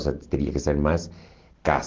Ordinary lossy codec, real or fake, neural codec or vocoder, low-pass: Opus, 16 kbps; real; none; 7.2 kHz